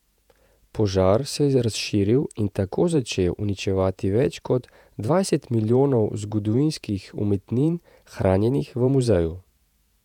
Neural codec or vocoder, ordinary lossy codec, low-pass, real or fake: vocoder, 48 kHz, 128 mel bands, Vocos; none; 19.8 kHz; fake